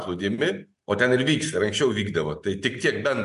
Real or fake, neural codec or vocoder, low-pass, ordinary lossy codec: real; none; 10.8 kHz; MP3, 64 kbps